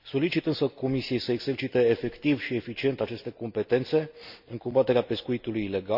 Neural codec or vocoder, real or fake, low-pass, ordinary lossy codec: none; real; 5.4 kHz; none